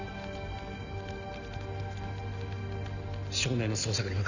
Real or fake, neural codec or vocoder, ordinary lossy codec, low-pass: real; none; none; 7.2 kHz